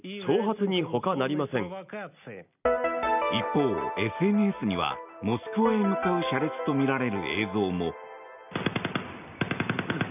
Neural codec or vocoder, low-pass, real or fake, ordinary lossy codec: none; 3.6 kHz; real; none